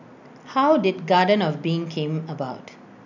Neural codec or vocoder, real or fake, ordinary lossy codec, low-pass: none; real; none; 7.2 kHz